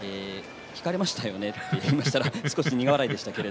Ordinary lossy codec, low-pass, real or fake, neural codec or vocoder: none; none; real; none